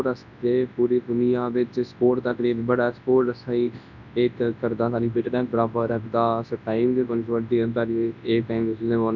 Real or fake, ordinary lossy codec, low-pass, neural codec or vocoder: fake; none; 7.2 kHz; codec, 24 kHz, 0.9 kbps, WavTokenizer, large speech release